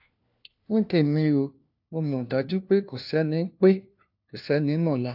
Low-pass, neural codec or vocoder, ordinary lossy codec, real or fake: 5.4 kHz; codec, 16 kHz, 1 kbps, FunCodec, trained on LibriTTS, 50 frames a second; AAC, 48 kbps; fake